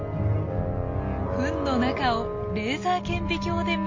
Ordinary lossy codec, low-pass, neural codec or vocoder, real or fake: MP3, 32 kbps; 7.2 kHz; none; real